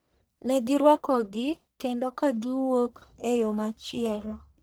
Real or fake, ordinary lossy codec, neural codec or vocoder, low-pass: fake; none; codec, 44.1 kHz, 1.7 kbps, Pupu-Codec; none